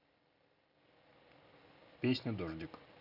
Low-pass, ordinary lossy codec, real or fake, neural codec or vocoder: 5.4 kHz; none; real; none